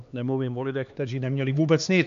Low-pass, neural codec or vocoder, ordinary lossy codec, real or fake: 7.2 kHz; codec, 16 kHz, 2 kbps, X-Codec, HuBERT features, trained on LibriSpeech; MP3, 96 kbps; fake